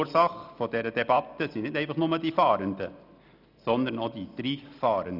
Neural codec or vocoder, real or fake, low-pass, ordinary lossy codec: none; real; 5.4 kHz; none